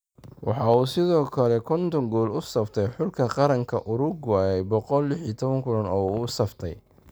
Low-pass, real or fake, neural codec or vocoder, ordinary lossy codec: none; real; none; none